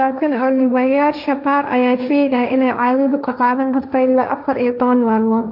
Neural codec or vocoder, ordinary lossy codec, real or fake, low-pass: codec, 16 kHz, 1.1 kbps, Voila-Tokenizer; none; fake; 5.4 kHz